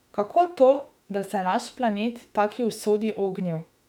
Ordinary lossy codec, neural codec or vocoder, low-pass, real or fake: none; autoencoder, 48 kHz, 32 numbers a frame, DAC-VAE, trained on Japanese speech; 19.8 kHz; fake